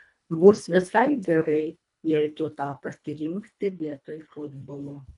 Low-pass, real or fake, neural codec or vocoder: 10.8 kHz; fake; codec, 24 kHz, 1.5 kbps, HILCodec